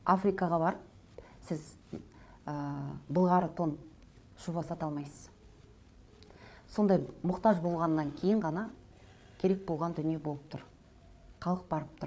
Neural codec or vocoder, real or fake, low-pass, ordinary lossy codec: codec, 16 kHz, 8 kbps, FreqCodec, larger model; fake; none; none